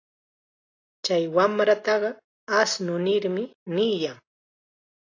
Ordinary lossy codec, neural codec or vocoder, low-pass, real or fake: AAC, 32 kbps; none; 7.2 kHz; real